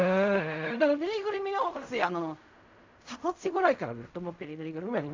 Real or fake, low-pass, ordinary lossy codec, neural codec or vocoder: fake; 7.2 kHz; MP3, 64 kbps; codec, 16 kHz in and 24 kHz out, 0.4 kbps, LongCat-Audio-Codec, fine tuned four codebook decoder